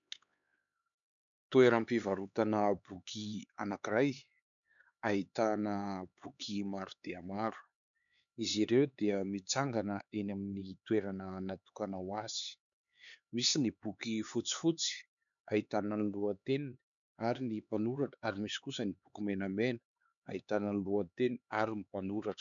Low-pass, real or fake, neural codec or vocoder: 7.2 kHz; fake; codec, 16 kHz, 4 kbps, X-Codec, HuBERT features, trained on LibriSpeech